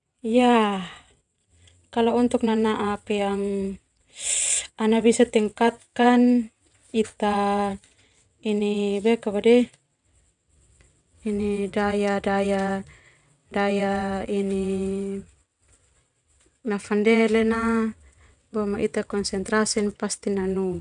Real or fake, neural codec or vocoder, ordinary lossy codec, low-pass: fake; vocoder, 22.05 kHz, 80 mel bands, WaveNeXt; none; 9.9 kHz